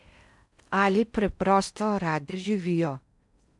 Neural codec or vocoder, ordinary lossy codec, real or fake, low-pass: codec, 16 kHz in and 24 kHz out, 0.6 kbps, FocalCodec, streaming, 4096 codes; none; fake; 10.8 kHz